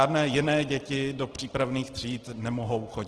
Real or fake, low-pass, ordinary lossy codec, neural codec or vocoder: real; 10.8 kHz; Opus, 16 kbps; none